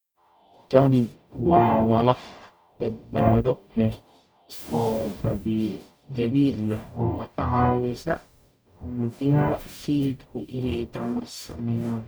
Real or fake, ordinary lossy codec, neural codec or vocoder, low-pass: fake; none; codec, 44.1 kHz, 0.9 kbps, DAC; none